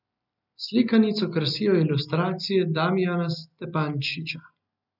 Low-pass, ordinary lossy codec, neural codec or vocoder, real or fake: 5.4 kHz; none; none; real